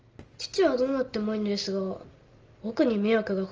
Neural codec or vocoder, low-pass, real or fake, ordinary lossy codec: none; 7.2 kHz; real; Opus, 24 kbps